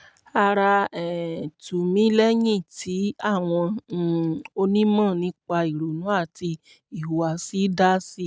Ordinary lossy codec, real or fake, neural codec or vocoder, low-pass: none; real; none; none